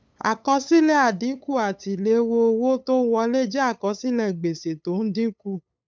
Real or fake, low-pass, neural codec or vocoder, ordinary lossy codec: fake; none; codec, 16 kHz, 8 kbps, FunCodec, trained on LibriTTS, 25 frames a second; none